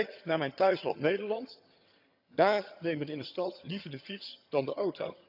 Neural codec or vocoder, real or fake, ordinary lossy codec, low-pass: vocoder, 22.05 kHz, 80 mel bands, HiFi-GAN; fake; none; 5.4 kHz